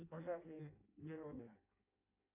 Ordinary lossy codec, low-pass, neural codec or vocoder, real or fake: AAC, 32 kbps; 3.6 kHz; codec, 16 kHz in and 24 kHz out, 0.6 kbps, FireRedTTS-2 codec; fake